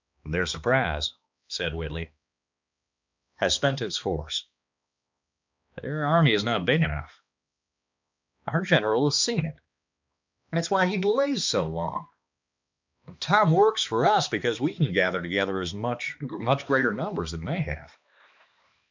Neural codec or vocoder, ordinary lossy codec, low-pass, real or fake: codec, 16 kHz, 2 kbps, X-Codec, HuBERT features, trained on balanced general audio; MP3, 64 kbps; 7.2 kHz; fake